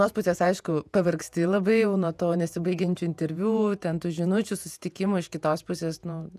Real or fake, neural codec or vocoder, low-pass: fake; vocoder, 48 kHz, 128 mel bands, Vocos; 14.4 kHz